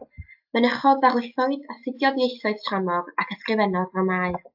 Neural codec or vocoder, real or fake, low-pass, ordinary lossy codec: none; real; 5.4 kHz; AAC, 48 kbps